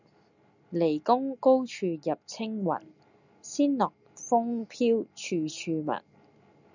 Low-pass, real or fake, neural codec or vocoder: 7.2 kHz; real; none